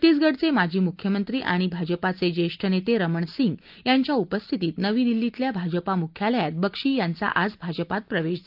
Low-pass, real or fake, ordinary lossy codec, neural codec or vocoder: 5.4 kHz; real; Opus, 32 kbps; none